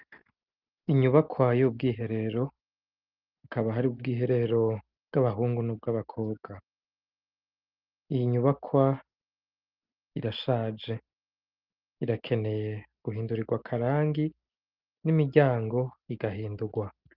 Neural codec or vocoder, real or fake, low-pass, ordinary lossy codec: none; real; 5.4 kHz; Opus, 24 kbps